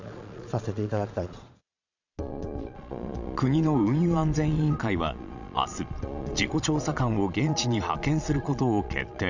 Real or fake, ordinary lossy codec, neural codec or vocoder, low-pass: fake; none; vocoder, 22.05 kHz, 80 mel bands, Vocos; 7.2 kHz